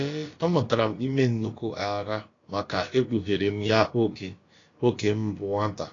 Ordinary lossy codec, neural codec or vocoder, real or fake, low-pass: AAC, 32 kbps; codec, 16 kHz, about 1 kbps, DyCAST, with the encoder's durations; fake; 7.2 kHz